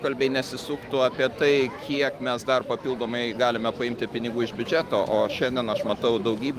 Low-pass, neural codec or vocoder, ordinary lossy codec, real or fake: 14.4 kHz; autoencoder, 48 kHz, 128 numbers a frame, DAC-VAE, trained on Japanese speech; Opus, 32 kbps; fake